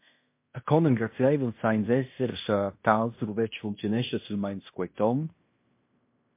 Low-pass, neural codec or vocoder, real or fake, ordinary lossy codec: 3.6 kHz; codec, 16 kHz in and 24 kHz out, 0.9 kbps, LongCat-Audio-Codec, fine tuned four codebook decoder; fake; MP3, 24 kbps